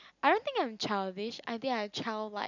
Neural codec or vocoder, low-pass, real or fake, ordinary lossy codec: none; 7.2 kHz; real; none